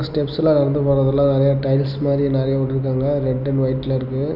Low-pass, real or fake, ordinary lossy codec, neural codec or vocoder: 5.4 kHz; real; none; none